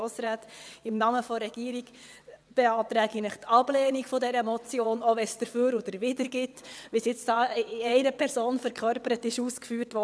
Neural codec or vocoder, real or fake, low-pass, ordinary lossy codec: vocoder, 22.05 kHz, 80 mel bands, WaveNeXt; fake; none; none